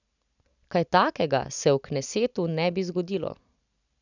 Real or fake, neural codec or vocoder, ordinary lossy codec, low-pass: real; none; none; 7.2 kHz